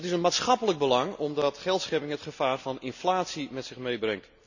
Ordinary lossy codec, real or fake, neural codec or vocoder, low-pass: none; real; none; 7.2 kHz